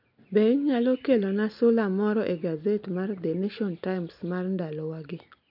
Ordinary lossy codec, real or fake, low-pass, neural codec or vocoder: none; real; 5.4 kHz; none